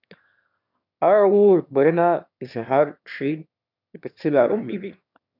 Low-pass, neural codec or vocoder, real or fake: 5.4 kHz; autoencoder, 22.05 kHz, a latent of 192 numbers a frame, VITS, trained on one speaker; fake